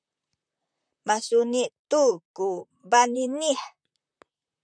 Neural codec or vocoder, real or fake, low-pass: vocoder, 44.1 kHz, 128 mel bands, Pupu-Vocoder; fake; 9.9 kHz